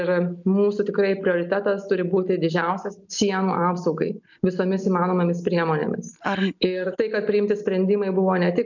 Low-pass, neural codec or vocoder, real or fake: 7.2 kHz; none; real